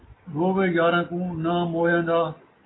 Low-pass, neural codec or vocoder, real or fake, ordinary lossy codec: 7.2 kHz; none; real; AAC, 16 kbps